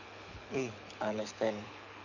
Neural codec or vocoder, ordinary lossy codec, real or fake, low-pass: codec, 24 kHz, 6 kbps, HILCodec; none; fake; 7.2 kHz